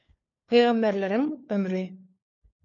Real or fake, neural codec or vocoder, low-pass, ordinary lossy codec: fake; codec, 16 kHz, 4 kbps, FunCodec, trained on LibriTTS, 50 frames a second; 7.2 kHz; MP3, 48 kbps